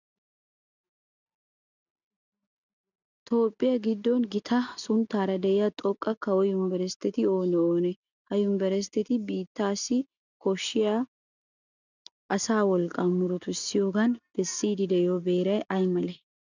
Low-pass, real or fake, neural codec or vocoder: 7.2 kHz; real; none